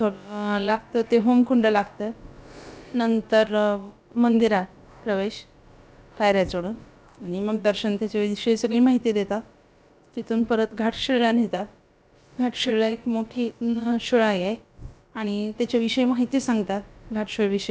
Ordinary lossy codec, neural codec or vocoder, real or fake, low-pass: none; codec, 16 kHz, about 1 kbps, DyCAST, with the encoder's durations; fake; none